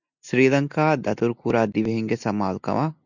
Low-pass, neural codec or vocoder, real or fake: 7.2 kHz; none; real